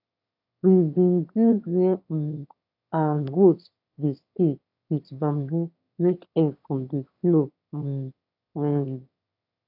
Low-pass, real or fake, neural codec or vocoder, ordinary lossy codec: 5.4 kHz; fake; autoencoder, 22.05 kHz, a latent of 192 numbers a frame, VITS, trained on one speaker; AAC, 48 kbps